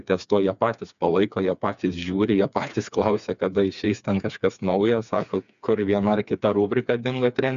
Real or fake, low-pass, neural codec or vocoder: fake; 7.2 kHz; codec, 16 kHz, 4 kbps, FreqCodec, smaller model